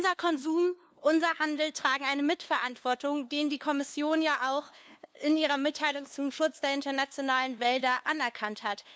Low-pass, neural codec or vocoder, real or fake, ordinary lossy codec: none; codec, 16 kHz, 2 kbps, FunCodec, trained on LibriTTS, 25 frames a second; fake; none